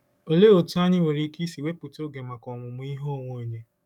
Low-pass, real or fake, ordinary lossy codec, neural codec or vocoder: 19.8 kHz; fake; none; codec, 44.1 kHz, 7.8 kbps, DAC